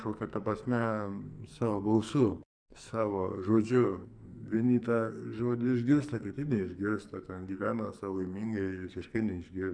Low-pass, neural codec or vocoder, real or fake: 9.9 kHz; codec, 44.1 kHz, 2.6 kbps, SNAC; fake